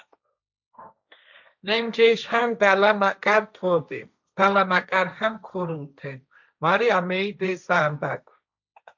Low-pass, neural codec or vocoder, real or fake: 7.2 kHz; codec, 16 kHz, 1.1 kbps, Voila-Tokenizer; fake